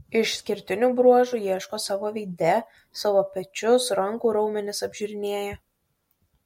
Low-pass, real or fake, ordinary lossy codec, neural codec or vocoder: 19.8 kHz; real; MP3, 64 kbps; none